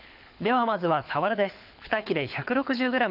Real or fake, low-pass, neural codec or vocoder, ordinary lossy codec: fake; 5.4 kHz; codec, 24 kHz, 6 kbps, HILCodec; none